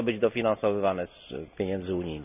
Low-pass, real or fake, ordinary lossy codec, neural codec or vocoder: 3.6 kHz; real; none; none